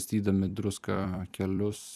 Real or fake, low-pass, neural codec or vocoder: fake; 14.4 kHz; vocoder, 44.1 kHz, 128 mel bands every 256 samples, BigVGAN v2